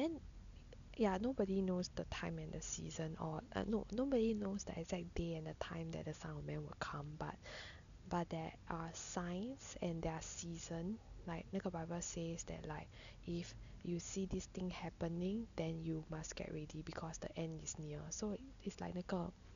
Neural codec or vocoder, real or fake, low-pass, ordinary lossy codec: none; real; 7.2 kHz; none